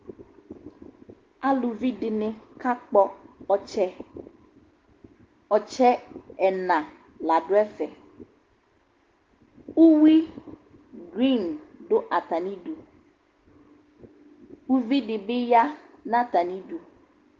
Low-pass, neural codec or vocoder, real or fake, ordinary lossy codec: 7.2 kHz; none; real; Opus, 16 kbps